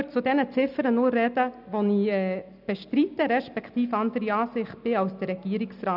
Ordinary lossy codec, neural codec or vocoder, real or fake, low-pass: none; none; real; 5.4 kHz